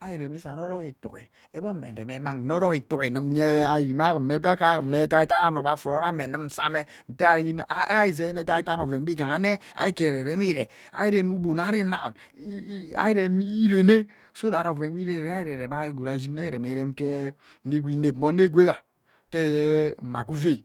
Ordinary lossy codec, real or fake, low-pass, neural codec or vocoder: none; fake; 19.8 kHz; codec, 44.1 kHz, 2.6 kbps, DAC